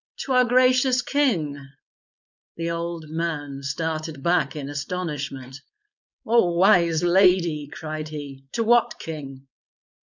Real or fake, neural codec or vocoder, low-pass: fake; codec, 16 kHz, 4.8 kbps, FACodec; 7.2 kHz